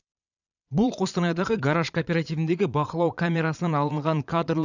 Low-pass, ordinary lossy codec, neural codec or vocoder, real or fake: 7.2 kHz; none; vocoder, 22.05 kHz, 80 mel bands, Vocos; fake